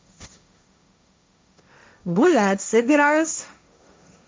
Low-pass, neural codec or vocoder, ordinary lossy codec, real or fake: none; codec, 16 kHz, 1.1 kbps, Voila-Tokenizer; none; fake